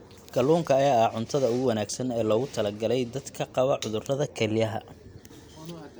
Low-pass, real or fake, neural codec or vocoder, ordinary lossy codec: none; real; none; none